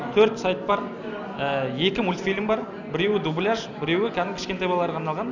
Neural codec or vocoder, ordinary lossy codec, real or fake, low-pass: none; none; real; 7.2 kHz